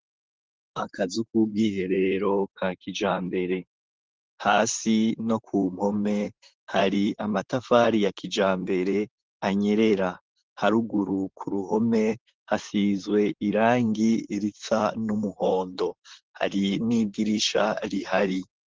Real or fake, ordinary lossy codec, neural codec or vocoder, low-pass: fake; Opus, 16 kbps; vocoder, 44.1 kHz, 128 mel bands, Pupu-Vocoder; 7.2 kHz